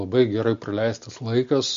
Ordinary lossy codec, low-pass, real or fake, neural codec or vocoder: MP3, 48 kbps; 7.2 kHz; real; none